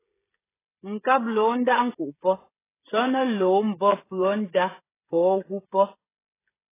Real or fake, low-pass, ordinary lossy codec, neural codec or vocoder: fake; 3.6 kHz; AAC, 16 kbps; codec, 16 kHz, 16 kbps, FreqCodec, smaller model